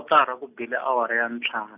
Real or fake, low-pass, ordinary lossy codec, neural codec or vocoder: real; 3.6 kHz; none; none